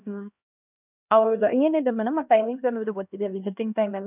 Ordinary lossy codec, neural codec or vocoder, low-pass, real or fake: none; codec, 16 kHz, 1 kbps, X-Codec, HuBERT features, trained on LibriSpeech; 3.6 kHz; fake